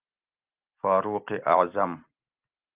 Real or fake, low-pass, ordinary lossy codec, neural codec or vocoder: real; 3.6 kHz; Opus, 32 kbps; none